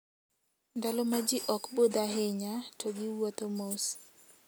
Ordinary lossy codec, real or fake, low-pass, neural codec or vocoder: none; real; none; none